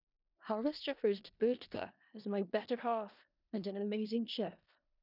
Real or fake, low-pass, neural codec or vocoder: fake; 5.4 kHz; codec, 16 kHz in and 24 kHz out, 0.4 kbps, LongCat-Audio-Codec, four codebook decoder